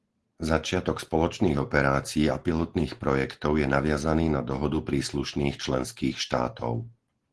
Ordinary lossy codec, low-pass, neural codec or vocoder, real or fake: Opus, 16 kbps; 10.8 kHz; none; real